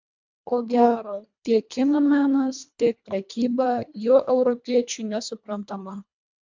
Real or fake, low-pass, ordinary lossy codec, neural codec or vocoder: fake; 7.2 kHz; MP3, 64 kbps; codec, 24 kHz, 1.5 kbps, HILCodec